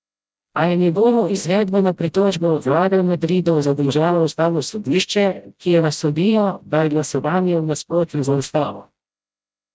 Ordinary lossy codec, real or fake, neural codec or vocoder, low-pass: none; fake; codec, 16 kHz, 0.5 kbps, FreqCodec, smaller model; none